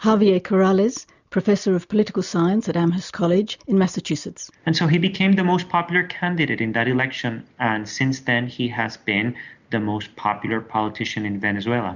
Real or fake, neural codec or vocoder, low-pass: real; none; 7.2 kHz